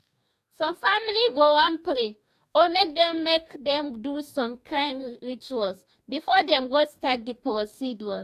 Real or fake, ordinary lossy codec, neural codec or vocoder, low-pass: fake; AAC, 96 kbps; codec, 44.1 kHz, 2.6 kbps, DAC; 14.4 kHz